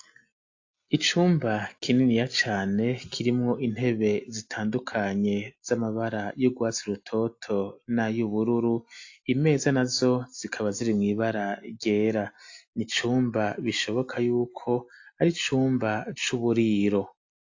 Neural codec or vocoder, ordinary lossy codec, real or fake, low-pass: none; AAC, 48 kbps; real; 7.2 kHz